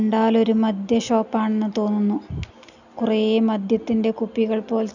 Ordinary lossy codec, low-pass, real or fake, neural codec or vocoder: none; 7.2 kHz; real; none